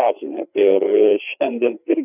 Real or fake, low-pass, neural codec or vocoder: fake; 3.6 kHz; codec, 16 kHz, 4 kbps, FreqCodec, larger model